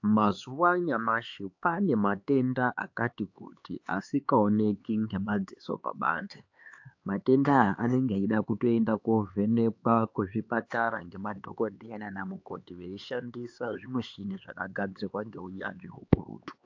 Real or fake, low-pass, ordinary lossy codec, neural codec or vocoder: fake; 7.2 kHz; AAC, 48 kbps; codec, 16 kHz, 4 kbps, X-Codec, HuBERT features, trained on LibriSpeech